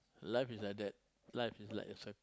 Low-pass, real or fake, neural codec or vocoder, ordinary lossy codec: none; real; none; none